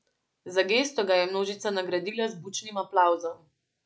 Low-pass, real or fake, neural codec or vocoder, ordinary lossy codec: none; real; none; none